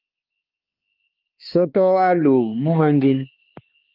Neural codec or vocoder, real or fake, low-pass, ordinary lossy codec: codec, 16 kHz, 2 kbps, X-Codec, HuBERT features, trained on balanced general audio; fake; 5.4 kHz; Opus, 24 kbps